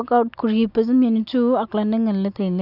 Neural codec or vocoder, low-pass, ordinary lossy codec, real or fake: none; 5.4 kHz; none; real